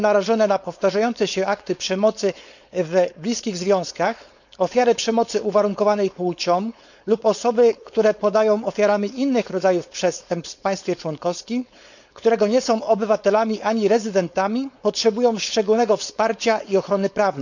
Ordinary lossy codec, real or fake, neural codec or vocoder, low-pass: none; fake; codec, 16 kHz, 4.8 kbps, FACodec; 7.2 kHz